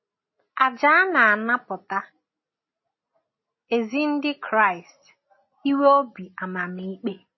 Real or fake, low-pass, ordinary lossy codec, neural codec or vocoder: real; 7.2 kHz; MP3, 24 kbps; none